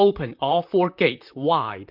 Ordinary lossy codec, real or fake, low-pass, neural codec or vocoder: MP3, 48 kbps; fake; 5.4 kHz; vocoder, 44.1 kHz, 128 mel bands, Pupu-Vocoder